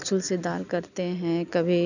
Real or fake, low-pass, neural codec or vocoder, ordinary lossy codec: real; 7.2 kHz; none; none